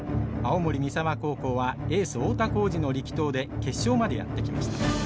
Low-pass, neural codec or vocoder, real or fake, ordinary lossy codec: none; none; real; none